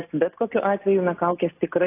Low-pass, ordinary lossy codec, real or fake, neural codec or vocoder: 3.6 kHz; AAC, 24 kbps; real; none